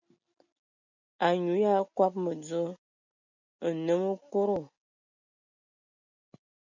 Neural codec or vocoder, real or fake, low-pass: none; real; 7.2 kHz